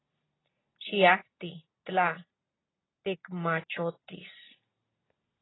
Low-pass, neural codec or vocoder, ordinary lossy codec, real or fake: 7.2 kHz; none; AAC, 16 kbps; real